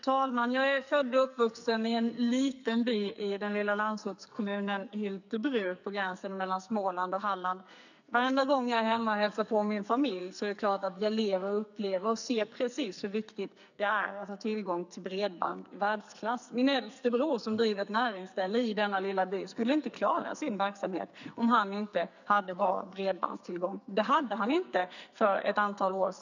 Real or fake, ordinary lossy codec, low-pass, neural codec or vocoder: fake; none; 7.2 kHz; codec, 44.1 kHz, 2.6 kbps, SNAC